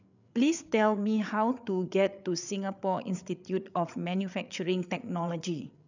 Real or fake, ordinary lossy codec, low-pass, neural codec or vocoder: fake; none; 7.2 kHz; codec, 16 kHz, 8 kbps, FreqCodec, larger model